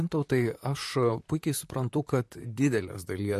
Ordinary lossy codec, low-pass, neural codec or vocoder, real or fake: MP3, 64 kbps; 14.4 kHz; vocoder, 44.1 kHz, 128 mel bands, Pupu-Vocoder; fake